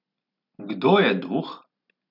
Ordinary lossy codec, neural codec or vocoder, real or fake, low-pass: none; none; real; 5.4 kHz